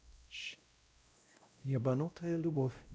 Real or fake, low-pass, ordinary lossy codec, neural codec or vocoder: fake; none; none; codec, 16 kHz, 0.5 kbps, X-Codec, WavLM features, trained on Multilingual LibriSpeech